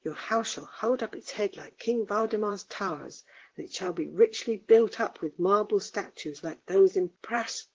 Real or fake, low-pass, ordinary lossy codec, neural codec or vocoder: fake; 7.2 kHz; Opus, 16 kbps; vocoder, 44.1 kHz, 128 mel bands, Pupu-Vocoder